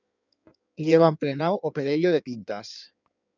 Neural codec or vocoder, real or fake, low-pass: codec, 16 kHz in and 24 kHz out, 1.1 kbps, FireRedTTS-2 codec; fake; 7.2 kHz